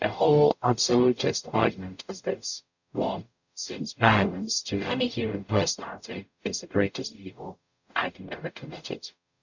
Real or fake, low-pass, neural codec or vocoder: fake; 7.2 kHz; codec, 44.1 kHz, 0.9 kbps, DAC